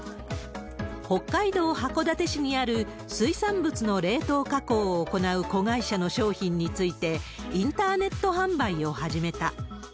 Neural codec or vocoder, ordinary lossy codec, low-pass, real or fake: none; none; none; real